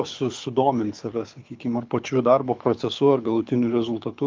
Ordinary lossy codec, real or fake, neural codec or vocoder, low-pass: Opus, 24 kbps; fake; codec, 24 kHz, 6 kbps, HILCodec; 7.2 kHz